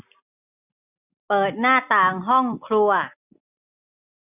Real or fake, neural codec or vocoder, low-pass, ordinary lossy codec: real; none; 3.6 kHz; none